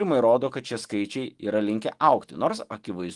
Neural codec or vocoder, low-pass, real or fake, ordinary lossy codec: autoencoder, 48 kHz, 128 numbers a frame, DAC-VAE, trained on Japanese speech; 10.8 kHz; fake; Opus, 24 kbps